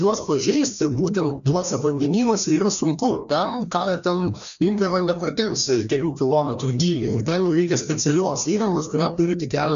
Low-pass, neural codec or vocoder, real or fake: 7.2 kHz; codec, 16 kHz, 1 kbps, FreqCodec, larger model; fake